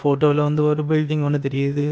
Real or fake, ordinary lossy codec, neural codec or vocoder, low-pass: fake; none; codec, 16 kHz, 0.8 kbps, ZipCodec; none